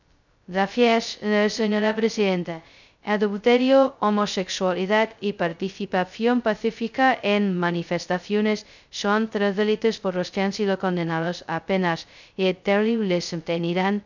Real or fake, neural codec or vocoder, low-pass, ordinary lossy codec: fake; codec, 16 kHz, 0.2 kbps, FocalCodec; 7.2 kHz; none